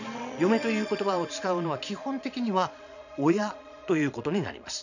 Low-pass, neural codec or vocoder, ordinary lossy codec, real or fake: 7.2 kHz; vocoder, 22.05 kHz, 80 mel bands, Vocos; none; fake